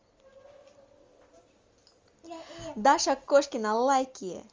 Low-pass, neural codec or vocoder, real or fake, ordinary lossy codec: 7.2 kHz; none; real; Opus, 32 kbps